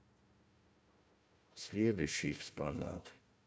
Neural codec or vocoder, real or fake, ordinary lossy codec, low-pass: codec, 16 kHz, 1 kbps, FunCodec, trained on Chinese and English, 50 frames a second; fake; none; none